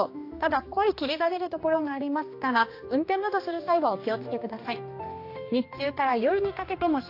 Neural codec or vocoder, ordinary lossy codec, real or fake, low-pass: codec, 16 kHz, 2 kbps, X-Codec, HuBERT features, trained on general audio; AAC, 32 kbps; fake; 5.4 kHz